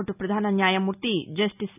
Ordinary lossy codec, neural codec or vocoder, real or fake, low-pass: none; none; real; 3.6 kHz